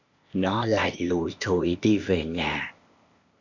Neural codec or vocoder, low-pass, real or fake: codec, 16 kHz, 0.8 kbps, ZipCodec; 7.2 kHz; fake